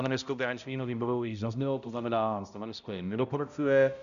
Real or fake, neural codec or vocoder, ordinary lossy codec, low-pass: fake; codec, 16 kHz, 0.5 kbps, X-Codec, HuBERT features, trained on balanced general audio; AAC, 96 kbps; 7.2 kHz